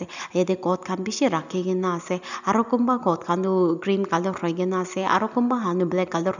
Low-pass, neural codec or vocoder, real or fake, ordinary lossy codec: 7.2 kHz; none; real; none